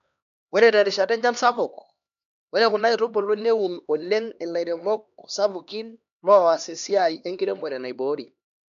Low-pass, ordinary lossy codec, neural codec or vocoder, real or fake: 7.2 kHz; none; codec, 16 kHz, 2 kbps, X-Codec, HuBERT features, trained on LibriSpeech; fake